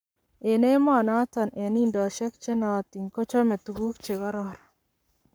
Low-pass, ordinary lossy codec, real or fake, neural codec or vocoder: none; none; fake; codec, 44.1 kHz, 7.8 kbps, Pupu-Codec